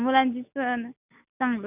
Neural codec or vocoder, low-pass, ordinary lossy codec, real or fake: none; 3.6 kHz; none; real